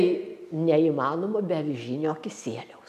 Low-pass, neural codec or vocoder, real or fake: 14.4 kHz; none; real